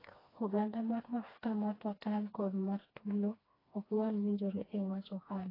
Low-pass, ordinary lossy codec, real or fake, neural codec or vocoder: 5.4 kHz; AAC, 24 kbps; fake; codec, 16 kHz, 2 kbps, FreqCodec, smaller model